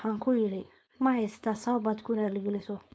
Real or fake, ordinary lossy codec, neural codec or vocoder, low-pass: fake; none; codec, 16 kHz, 4.8 kbps, FACodec; none